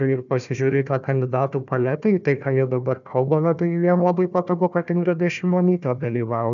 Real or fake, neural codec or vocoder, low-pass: fake; codec, 16 kHz, 1 kbps, FunCodec, trained on Chinese and English, 50 frames a second; 7.2 kHz